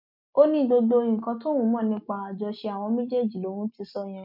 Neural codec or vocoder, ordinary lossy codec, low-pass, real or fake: none; MP3, 48 kbps; 5.4 kHz; real